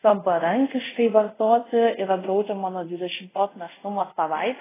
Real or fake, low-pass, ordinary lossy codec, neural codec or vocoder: fake; 3.6 kHz; AAC, 16 kbps; codec, 24 kHz, 0.5 kbps, DualCodec